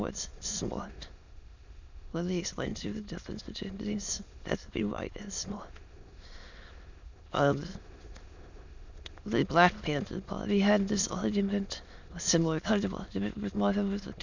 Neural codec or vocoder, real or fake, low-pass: autoencoder, 22.05 kHz, a latent of 192 numbers a frame, VITS, trained on many speakers; fake; 7.2 kHz